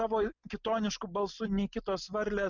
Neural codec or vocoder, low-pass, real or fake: none; 7.2 kHz; real